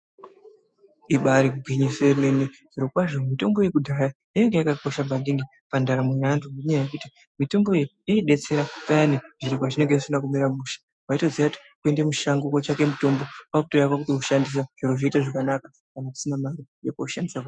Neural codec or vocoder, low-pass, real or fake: autoencoder, 48 kHz, 128 numbers a frame, DAC-VAE, trained on Japanese speech; 9.9 kHz; fake